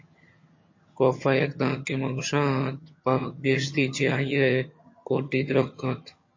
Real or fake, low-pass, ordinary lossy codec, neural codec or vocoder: fake; 7.2 kHz; MP3, 32 kbps; vocoder, 22.05 kHz, 80 mel bands, HiFi-GAN